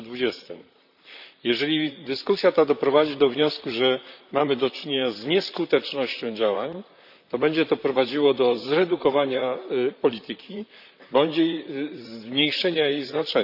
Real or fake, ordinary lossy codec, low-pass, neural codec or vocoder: fake; none; 5.4 kHz; vocoder, 44.1 kHz, 128 mel bands, Pupu-Vocoder